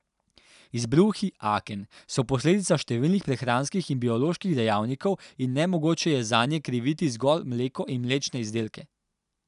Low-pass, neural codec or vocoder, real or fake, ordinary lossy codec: 10.8 kHz; none; real; none